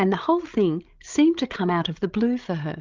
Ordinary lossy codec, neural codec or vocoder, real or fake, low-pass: Opus, 32 kbps; codec, 16 kHz, 16 kbps, FreqCodec, larger model; fake; 7.2 kHz